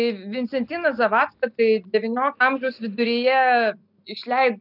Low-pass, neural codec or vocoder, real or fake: 5.4 kHz; none; real